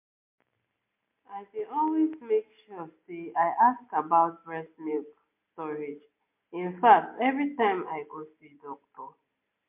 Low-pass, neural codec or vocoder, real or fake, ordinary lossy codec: 3.6 kHz; none; real; MP3, 32 kbps